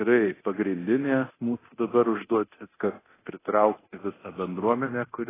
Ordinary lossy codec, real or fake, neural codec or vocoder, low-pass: AAC, 16 kbps; fake; codec, 24 kHz, 0.9 kbps, DualCodec; 3.6 kHz